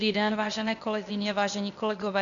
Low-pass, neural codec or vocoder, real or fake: 7.2 kHz; codec, 16 kHz, 0.8 kbps, ZipCodec; fake